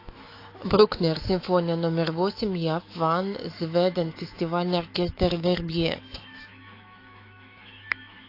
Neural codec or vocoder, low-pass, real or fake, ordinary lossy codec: autoencoder, 48 kHz, 128 numbers a frame, DAC-VAE, trained on Japanese speech; 5.4 kHz; fake; AAC, 32 kbps